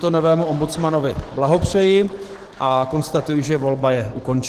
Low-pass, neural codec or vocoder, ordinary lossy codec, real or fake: 14.4 kHz; codec, 44.1 kHz, 7.8 kbps, DAC; Opus, 16 kbps; fake